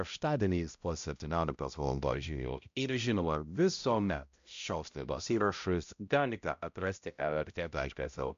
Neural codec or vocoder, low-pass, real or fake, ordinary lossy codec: codec, 16 kHz, 0.5 kbps, X-Codec, HuBERT features, trained on balanced general audio; 7.2 kHz; fake; MP3, 64 kbps